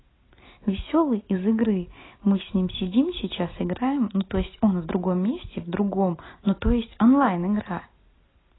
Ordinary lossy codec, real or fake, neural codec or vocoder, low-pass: AAC, 16 kbps; real; none; 7.2 kHz